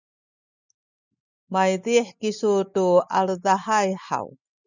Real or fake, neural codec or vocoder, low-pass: real; none; 7.2 kHz